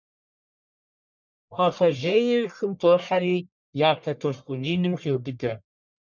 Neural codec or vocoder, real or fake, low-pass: codec, 44.1 kHz, 1.7 kbps, Pupu-Codec; fake; 7.2 kHz